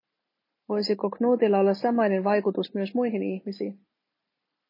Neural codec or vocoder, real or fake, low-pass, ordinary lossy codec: none; real; 5.4 kHz; MP3, 24 kbps